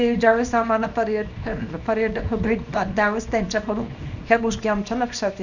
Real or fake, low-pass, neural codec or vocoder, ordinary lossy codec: fake; 7.2 kHz; codec, 24 kHz, 0.9 kbps, WavTokenizer, small release; none